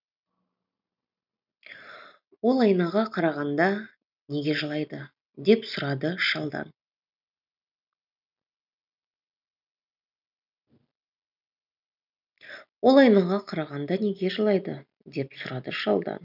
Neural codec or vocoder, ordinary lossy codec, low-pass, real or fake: none; none; 5.4 kHz; real